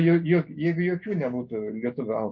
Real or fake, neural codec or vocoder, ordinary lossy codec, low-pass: real; none; MP3, 32 kbps; 7.2 kHz